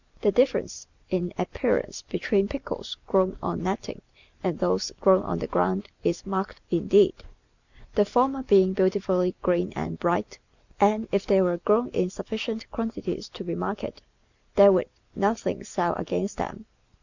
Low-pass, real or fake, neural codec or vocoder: 7.2 kHz; real; none